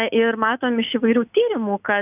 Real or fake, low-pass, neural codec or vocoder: real; 3.6 kHz; none